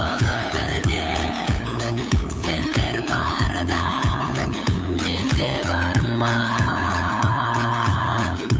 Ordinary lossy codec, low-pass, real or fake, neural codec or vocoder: none; none; fake; codec, 16 kHz, 8 kbps, FunCodec, trained on LibriTTS, 25 frames a second